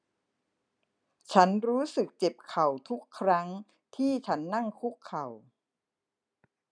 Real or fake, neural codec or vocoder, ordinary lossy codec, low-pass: real; none; none; 9.9 kHz